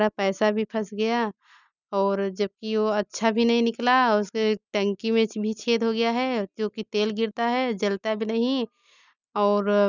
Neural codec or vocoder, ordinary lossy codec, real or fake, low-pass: none; none; real; 7.2 kHz